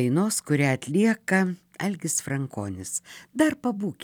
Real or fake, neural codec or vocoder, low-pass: fake; vocoder, 44.1 kHz, 128 mel bands every 256 samples, BigVGAN v2; 19.8 kHz